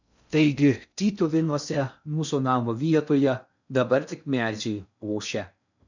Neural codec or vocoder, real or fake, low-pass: codec, 16 kHz in and 24 kHz out, 0.6 kbps, FocalCodec, streaming, 2048 codes; fake; 7.2 kHz